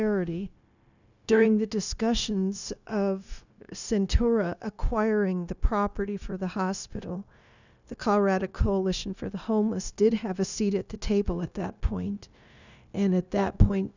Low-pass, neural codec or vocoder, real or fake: 7.2 kHz; codec, 16 kHz, 0.9 kbps, LongCat-Audio-Codec; fake